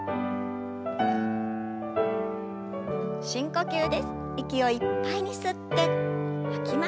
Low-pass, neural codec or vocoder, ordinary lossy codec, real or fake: none; none; none; real